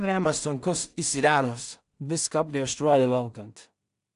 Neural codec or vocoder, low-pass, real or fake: codec, 16 kHz in and 24 kHz out, 0.4 kbps, LongCat-Audio-Codec, two codebook decoder; 10.8 kHz; fake